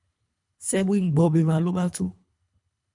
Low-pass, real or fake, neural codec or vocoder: 10.8 kHz; fake; codec, 24 kHz, 3 kbps, HILCodec